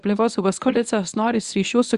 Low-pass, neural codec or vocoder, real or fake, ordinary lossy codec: 10.8 kHz; codec, 24 kHz, 0.9 kbps, WavTokenizer, medium speech release version 1; fake; Opus, 64 kbps